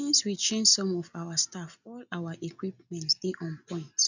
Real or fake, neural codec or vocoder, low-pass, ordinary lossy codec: real; none; 7.2 kHz; none